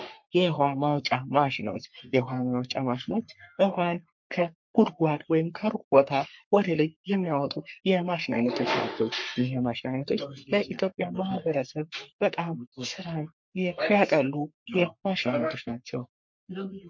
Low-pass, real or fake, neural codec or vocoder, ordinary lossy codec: 7.2 kHz; fake; codec, 44.1 kHz, 3.4 kbps, Pupu-Codec; MP3, 48 kbps